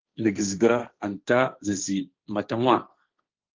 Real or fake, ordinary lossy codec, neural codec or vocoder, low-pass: fake; Opus, 32 kbps; codec, 16 kHz, 1.1 kbps, Voila-Tokenizer; 7.2 kHz